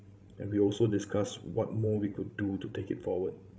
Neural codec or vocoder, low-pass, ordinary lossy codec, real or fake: codec, 16 kHz, 16 kbps, FreqCodec, larger model; none; none; fake